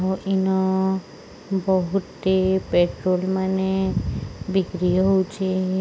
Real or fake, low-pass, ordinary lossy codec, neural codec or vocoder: real; none; none; none